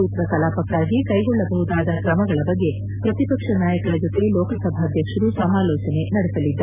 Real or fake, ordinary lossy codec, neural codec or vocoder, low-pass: real; none; none; 3.6 kHz